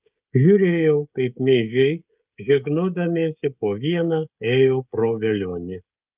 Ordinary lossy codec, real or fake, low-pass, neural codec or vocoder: Opus, 24 kbps; fake; 3.6 kHz; codec, 16 kHz, 16 kbps, FreqCodec, smaller model